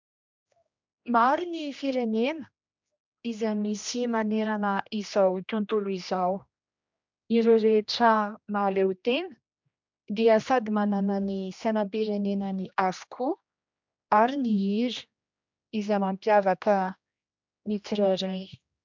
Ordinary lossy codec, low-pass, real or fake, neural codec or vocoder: MP3, 64 kbps; 7.2 kHz; fake; codec, 16 kHz, 1 kbps, X-Codec, HuBERT features, trained on general audio